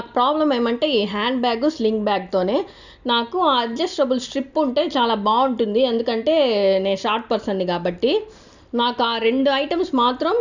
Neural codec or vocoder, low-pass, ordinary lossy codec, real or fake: none; 7.2 kHz; none; real